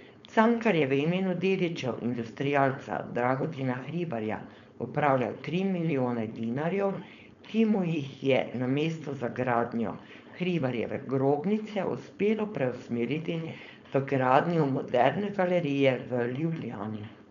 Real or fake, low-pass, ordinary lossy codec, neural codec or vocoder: fake; 7.2 kHz; none; codec, 16 kHz, 4.8 kbps, FACodec